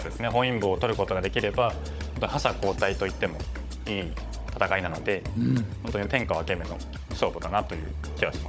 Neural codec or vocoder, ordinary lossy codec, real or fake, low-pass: codec, 16 kHz, 16 kbps, FunCodec, trained on Chinese and English, 50 frames a second; none; fake; none